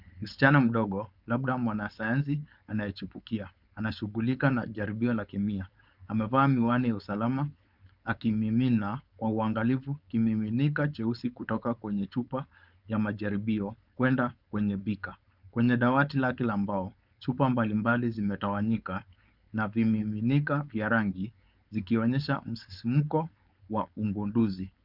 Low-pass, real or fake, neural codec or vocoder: 5.4 kHz; fake; codec, 16 kHz, 4.8 kbps, FACodec